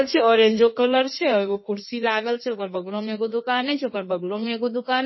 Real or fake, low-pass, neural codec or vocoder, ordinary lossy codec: fake; 7.2 kHz; codec, 16 kHz in and 24 kHz out, 1.1 kbps, FireRedTTS-2 codec; MP3, 24 kbps